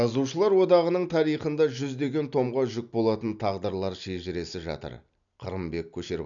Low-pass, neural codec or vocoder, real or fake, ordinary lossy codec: 7.2 kHz; none; real; none